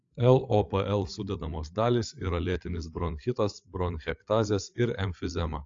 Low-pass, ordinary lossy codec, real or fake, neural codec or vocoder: 7.2 kHz; AAC, 48 kbps; fake; codec, 16 kHz, 8 kbps, FreqCodec, larger model